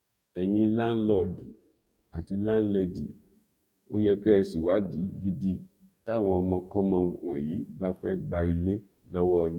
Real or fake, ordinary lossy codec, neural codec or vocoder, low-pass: fake; none; codec, 44.1 kHz, 2.6 kbps, DAC; 19.8 kHz